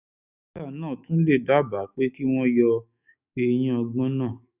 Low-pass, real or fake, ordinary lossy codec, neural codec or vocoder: 3.6 kHz; fake; none; autoencoder, 48 kHz, 128 numbers a frame, DAC-VAE, trained on Japanese speech